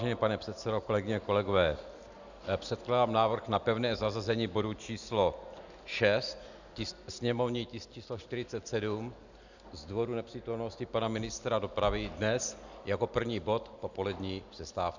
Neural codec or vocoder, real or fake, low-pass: none; real; 7.2 kHz